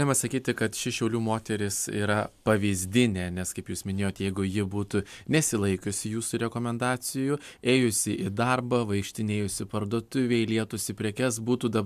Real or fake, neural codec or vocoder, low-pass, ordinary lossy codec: real; none; 14.4 kHz; MP3, 96 kbps